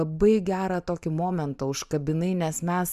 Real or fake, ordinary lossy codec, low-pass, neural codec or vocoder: fake; Opus, 64 kbps; 14.4 kHz; vocoder, 44.1 kHz, 128 mel bands every 512 samples, BigVGAN v2